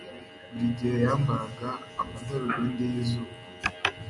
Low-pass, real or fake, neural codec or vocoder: 10.8 kHz; real; none